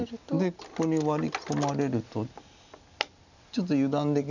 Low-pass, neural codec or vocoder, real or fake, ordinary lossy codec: 7.2 kHz; none; real; none